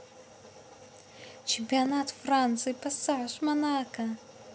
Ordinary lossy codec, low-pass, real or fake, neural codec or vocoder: none; none; real; none